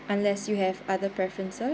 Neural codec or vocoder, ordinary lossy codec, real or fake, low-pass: none; none; real; none